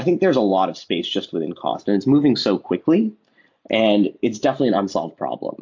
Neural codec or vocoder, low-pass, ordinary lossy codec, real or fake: none; 7.2 kHz; MP3, 48 kbps; real